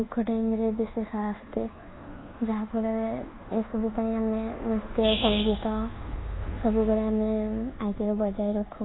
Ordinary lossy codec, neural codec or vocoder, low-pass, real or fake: AAC, 16 kbps; codec, 24 kHz, 1.2 kbps, DualCodec; 7.2 kHz; fake